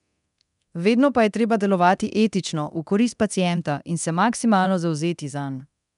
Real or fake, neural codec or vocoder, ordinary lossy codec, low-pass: fake; codec, 24 kHz, 0.9 kbps, DualCodec; none; 10.8 kHz